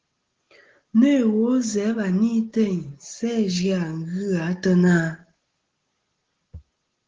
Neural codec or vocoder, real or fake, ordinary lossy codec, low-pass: none; real; Opus, 16 kbps; 7.2 kHz